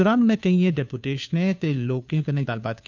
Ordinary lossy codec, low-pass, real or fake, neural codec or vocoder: none; 7.2 kHz; fake; codec, 16 kHz, 2 kbps, FunCodec, trained on Chinese and English, 25 frames a second